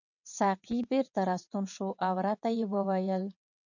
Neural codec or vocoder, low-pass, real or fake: vocoder, 22.05 kHz, 80 mel bands, WaveNeXt; 7.2 kHz; fake